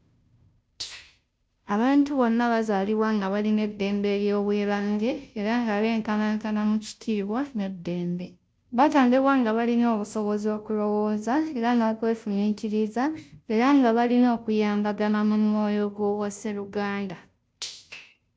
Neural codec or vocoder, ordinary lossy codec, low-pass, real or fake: codec, 16 kHz, 0.5 kbps, FunCodec, trained on Chinese and English, 25 frames a second; none; none; fake